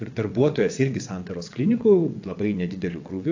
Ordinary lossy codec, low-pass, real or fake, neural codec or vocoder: AAC, 48 kbps; 7.2 kHz; fake; vocoder, 22.05 kHz, 80 mel bands, WaveNeXt